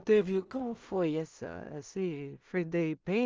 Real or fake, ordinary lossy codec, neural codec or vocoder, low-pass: fake; Opus, 24 kbps; codec, 16 kHz in and 24 kHz out, 0.4 kbps, LongCat-Audio-Codec, two codebook decoder; 7.2 kHz